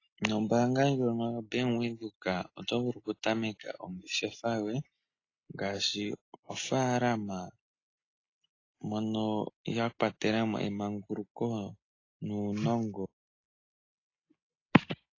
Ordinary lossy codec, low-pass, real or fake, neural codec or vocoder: AAC, 32 kbps; 7.2 kHz; real; none